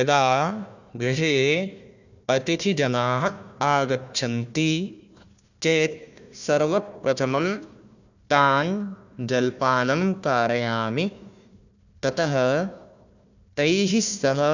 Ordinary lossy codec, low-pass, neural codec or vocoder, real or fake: none; 7.2 kHz; codec, 16 kHz, 1 kbps, FunCodec, trained on Chinese and English, 50 frames a second; fake